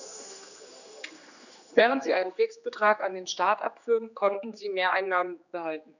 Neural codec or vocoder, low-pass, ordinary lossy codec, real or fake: codec, 16 kHz, 2 kbps, X-Codec, HuBERT features, trained on general audio; 7.2 kHz; none; fake